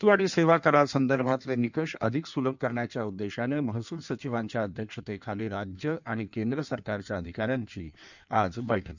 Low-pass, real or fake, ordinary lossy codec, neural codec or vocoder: 7.2 kHz; fake; none; codec, 16 kHz in and 24 kHz out, 1.1 kbps, FireRedTTS-2 codec